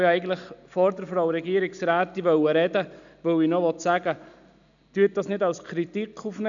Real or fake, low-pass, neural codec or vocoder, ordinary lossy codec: real; 7.2 kHz; none; none